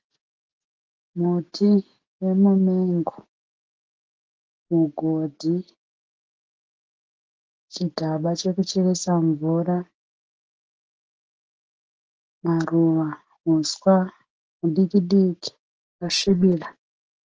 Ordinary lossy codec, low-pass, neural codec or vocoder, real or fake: Opus, 16 kbps; 7.2 kHz; none; real